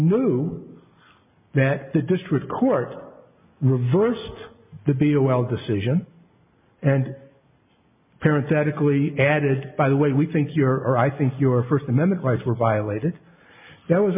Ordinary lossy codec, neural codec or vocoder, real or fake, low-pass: MP3, 32 kbps; none; real; 3.6 kHz